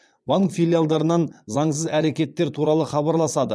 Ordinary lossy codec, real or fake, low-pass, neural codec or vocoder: none; fake; none; vocoder, 22.05 kHz, 80 mel bands, Vocos